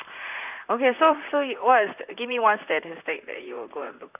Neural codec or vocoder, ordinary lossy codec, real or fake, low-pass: vocoder, 44.1 kHz, 128 mel bands, Pupu-Vocoder; none; fake; 3.6 kHz